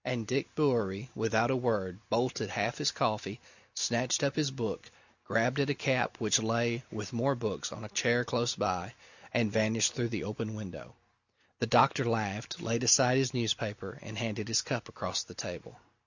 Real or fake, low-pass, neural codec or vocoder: real; 7.2 kHz; none